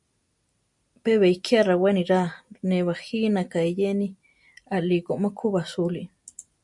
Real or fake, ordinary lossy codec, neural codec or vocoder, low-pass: real; MP3, 64 kbps; none; 10.8 kHz